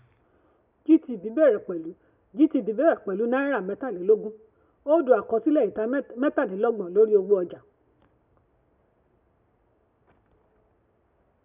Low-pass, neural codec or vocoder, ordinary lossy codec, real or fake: 3.6 kHz; none; none; real